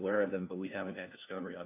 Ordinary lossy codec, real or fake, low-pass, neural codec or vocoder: AAC, 32 kbps; fake; 3.6 kHz; codec, 16 kHz, 1 kbps, FunCodec, trained on LibriTTS, 50 frames a second